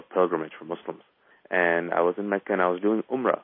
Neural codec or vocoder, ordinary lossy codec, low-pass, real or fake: none; MP3, 24 kbps; 5.4 kHz; real